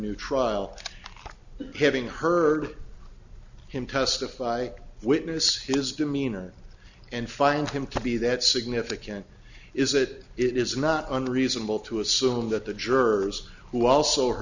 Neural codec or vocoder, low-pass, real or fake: none; 7.2 kHz; real